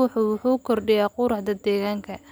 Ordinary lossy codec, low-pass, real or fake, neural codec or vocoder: none; none; real; none